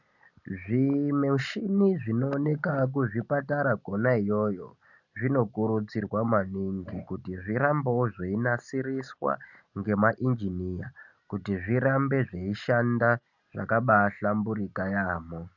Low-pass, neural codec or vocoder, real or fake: 7.2 kHz; none; real